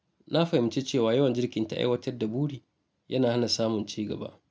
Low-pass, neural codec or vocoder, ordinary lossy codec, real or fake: none; none; none; real